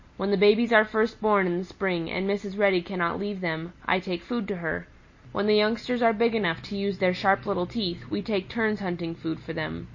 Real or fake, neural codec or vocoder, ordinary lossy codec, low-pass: real; none; MP3, 32 kbps; 7.2 kHz